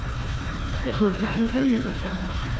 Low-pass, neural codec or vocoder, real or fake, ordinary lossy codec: none; codec, 16 kHz, 1 kbps, FunCodec, trained on Chinese and English, 50 frames a second; fake; none